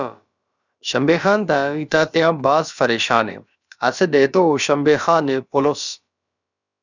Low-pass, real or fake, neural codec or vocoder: 7.2 kHz; fake; codec, 16 kHz, about 1 kbps, DyCAST, with the encoder's durations